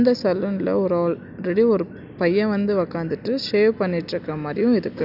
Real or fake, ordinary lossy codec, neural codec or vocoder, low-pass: real; none; none; 5.4 kHz